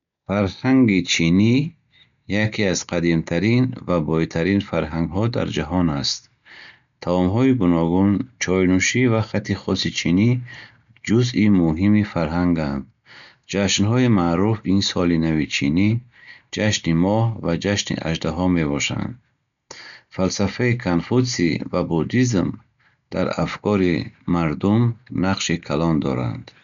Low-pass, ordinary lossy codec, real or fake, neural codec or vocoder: 7.2 kHz; none; real; none